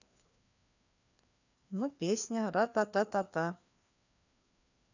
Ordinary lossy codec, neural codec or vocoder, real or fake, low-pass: none; codec, 16 kHz, 2 kbps, FreqCodec, larger model; fake; 7.2 kHz